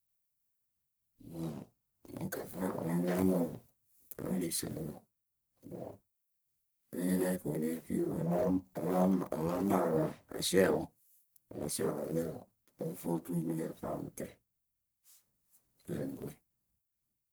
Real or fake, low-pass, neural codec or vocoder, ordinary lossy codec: fake; none; codec, 44.1 kHz, 1.7 kbps, Pupu-Codec; none